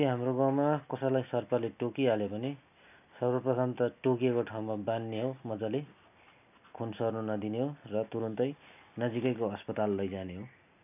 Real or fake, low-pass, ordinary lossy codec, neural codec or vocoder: real; 3.6 kHz; none; none